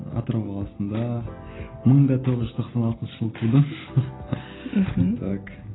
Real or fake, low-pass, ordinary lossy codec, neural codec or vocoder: real; 7.2 kHz; AAC, 16 kbps; none